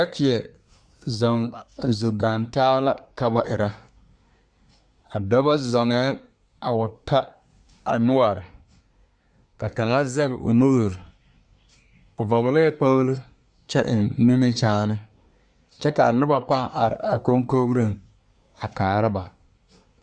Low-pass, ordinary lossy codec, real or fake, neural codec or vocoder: 9.9 kHz; Opus, 64 kbps; fake; codec, 24 kHz, 1 kbps, SNAC